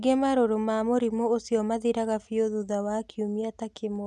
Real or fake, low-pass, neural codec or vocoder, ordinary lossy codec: real; none; none; none